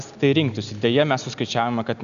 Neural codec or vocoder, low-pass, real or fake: none; 7.2 kHz; real